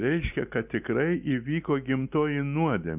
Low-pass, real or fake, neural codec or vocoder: 3.6 kHz; real; none